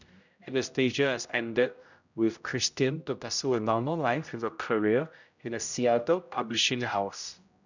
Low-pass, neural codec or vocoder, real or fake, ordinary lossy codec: 7.2 kHz; codec, 16 kHz, 0.5 kbps, X-Codec, HuBERT features, trained on general audio; fake; none